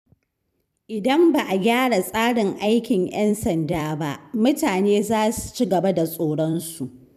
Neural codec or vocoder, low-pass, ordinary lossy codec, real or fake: vocoder, 44.1 kHz, 128 mel bands every 256 samples, BigVGAN v2; 14.4 kHz; none; fake